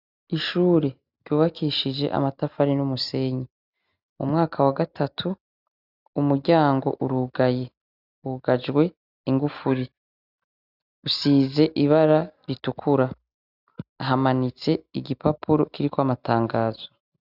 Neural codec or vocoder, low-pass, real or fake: none; 5.4 kHz; real